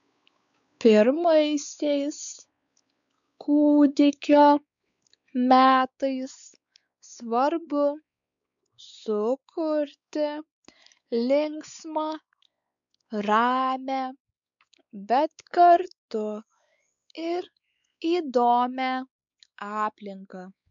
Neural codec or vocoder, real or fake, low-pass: codec, 16 kHz, 4 kbps, X-Codec, WavLM features, trained on Multilingual LibriSpeech; fake; 7.2 kHz